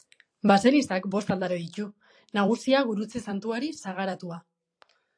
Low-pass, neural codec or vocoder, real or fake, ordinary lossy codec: 9.9 kHz; vocoder, 44.1 kHz, 128 mel bands every 256 samples, BigVGAN v2; fake; AAC, 64 kbps